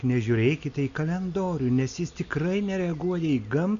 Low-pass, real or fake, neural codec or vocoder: 7.2 kHz; real; none